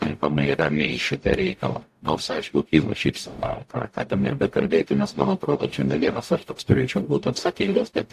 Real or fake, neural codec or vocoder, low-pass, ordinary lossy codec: fake; codec, 44.1 kHz, 0.9 kbps, DAC; 14.4 kHz; AAC, 64 kbps